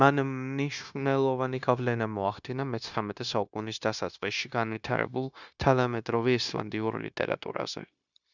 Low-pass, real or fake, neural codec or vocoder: 7.2 kHz; fake; codec, 16 kHz, 0.9 kbps, LongCat-Audio-Codec